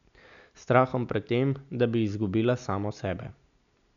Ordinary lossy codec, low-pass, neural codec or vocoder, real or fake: none; 7.2 kHz; none; real